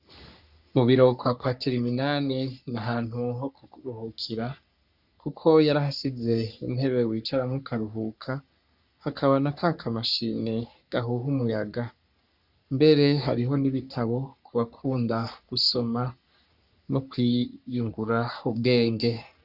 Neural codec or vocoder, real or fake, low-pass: codec, 44.1 kHz, 3.4 kbps, Pupu-Codec; fake; 5.4 kHz